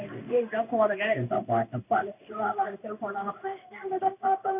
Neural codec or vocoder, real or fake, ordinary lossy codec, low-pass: codec, 16 kHz in and 24 kHz out, 1 kbps, XY-Tokenizer; fake; none; 3.6 kHz